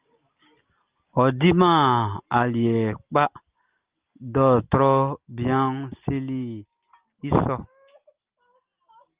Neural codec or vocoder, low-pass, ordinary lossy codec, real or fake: none; 3.6 kHz; Opus, 32 kbps; real